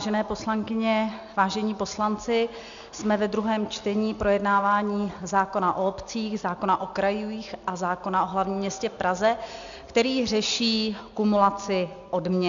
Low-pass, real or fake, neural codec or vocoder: 7.2 kHz; real; none